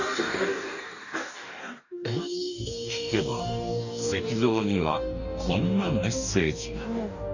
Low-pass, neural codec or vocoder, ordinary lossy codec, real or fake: 7.2 kHz; codec, 44.1 kHz, 2.6 kbps, DAC; none; fake